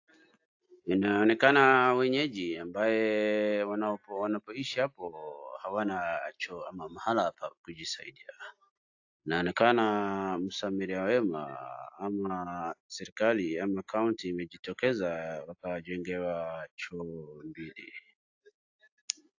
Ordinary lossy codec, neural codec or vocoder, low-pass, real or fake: AAC, 48 kbps; none; 7.2 kHz; real